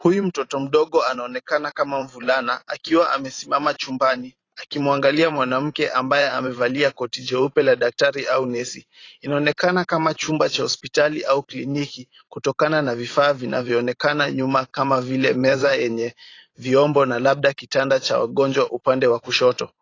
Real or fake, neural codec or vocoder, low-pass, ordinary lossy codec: fake; vocoder, 44.1 kHz, 128 mel bands every 512 samples, BigVGAN v2; 7.2 kHz; AAC, 32 kbps